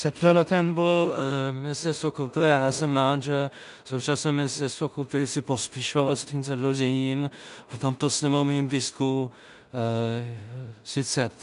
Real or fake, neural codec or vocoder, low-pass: fake; codec, 16 kHz in and 24 kHz out, 0.4 kbps, LongCat-Audio-Codec, two codebook decoder; 10.8 kHz